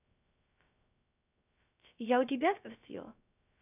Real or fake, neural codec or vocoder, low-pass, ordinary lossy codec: fake; codec, 16 kHz, 0.3 kbps, FocalCodec; 3.6 kHz; none